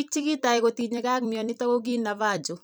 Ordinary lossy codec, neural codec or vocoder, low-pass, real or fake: none; vocoder, 44.1 kHz, 128 mel bands every 256 samples, BigVGAN v2; none; fake